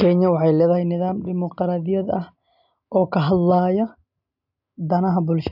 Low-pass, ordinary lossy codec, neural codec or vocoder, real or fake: 5.4 kHz; none; none; real